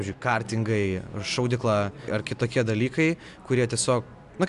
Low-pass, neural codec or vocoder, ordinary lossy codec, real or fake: 10.8 kHz; none; MP3, 96 kbps; real